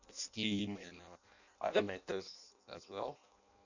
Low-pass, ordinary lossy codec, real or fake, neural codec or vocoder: 7.2 kHz; none; fake; codec, 16 kHz in and 24 kHz out, 0.6 kbps, FireRedTTS-2 codec